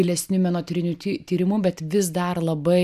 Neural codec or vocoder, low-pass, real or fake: none; 14.4 kHz; real